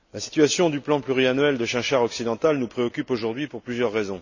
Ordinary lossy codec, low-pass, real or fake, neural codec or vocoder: none; 7.2 kHz; real; none